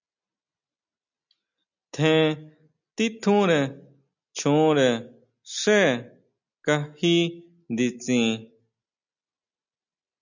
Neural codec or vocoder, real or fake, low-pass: none; real; 7.2 kHz